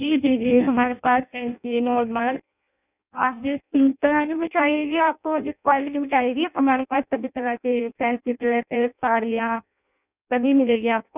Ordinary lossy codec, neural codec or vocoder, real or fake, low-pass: none; codec, 16 kHz in and 24 kHz out, 0.6 kbps, FireRedTTS-2 codec; fake; 3.6 kHz